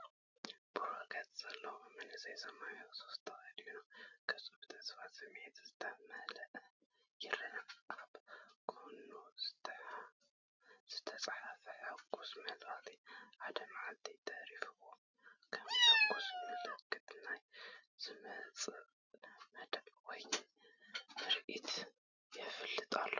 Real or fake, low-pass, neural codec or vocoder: real; 7.2 kHz; none